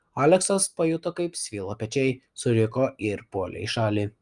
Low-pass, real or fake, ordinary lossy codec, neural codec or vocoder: 10.8 kHz; real; Opus, 32 kbps; none